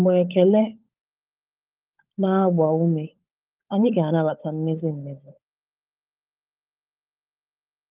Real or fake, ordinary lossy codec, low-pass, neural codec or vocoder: fake; Opus, 24 kbps; 3.6 kHz; codec, 16 kHz, 16 kbps, FunCodec, trained on LibriTTS, 50 frames a second